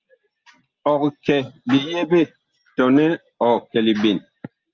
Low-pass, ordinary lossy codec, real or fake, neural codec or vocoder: 7.2 kHz; Opus, 24 kbps; real; none